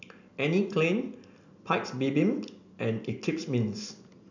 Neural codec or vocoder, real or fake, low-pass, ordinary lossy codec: none; real; 7.2 kHz; none